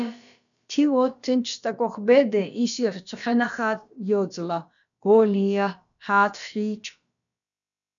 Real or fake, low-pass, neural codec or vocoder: fake; 7.2 kHz; codec, 16 kHz, about 1 kbps, DyCAST, with the encoder's durations